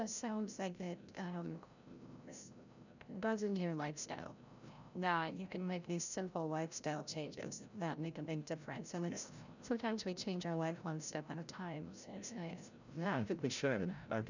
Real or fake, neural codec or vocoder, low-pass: fake; codec, 16 kHz, 0.5 kbps, FreqCodec, larger model; 7.2 kHz